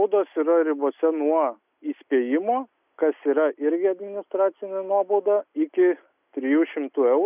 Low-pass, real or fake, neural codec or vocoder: 3.6 kHz; real; none